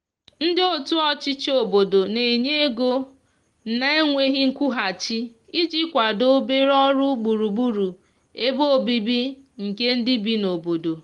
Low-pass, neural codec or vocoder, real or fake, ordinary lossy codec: 10.8 kHz; none; real; Opus, 24 kbps